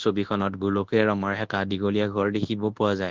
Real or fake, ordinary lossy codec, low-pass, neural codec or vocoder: fake; Opus, 16 kbps; 7.2 kHz; codec, 24 kHz, 0.9 kbps, WavTokenizer, large speech release